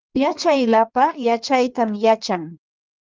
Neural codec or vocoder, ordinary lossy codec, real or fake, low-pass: codec, 16 kHz in and 24 kHz out, 1.1 kbps, FireRedTTS-2 codec; Opus, 24 kbps; fake; 7.2 kHz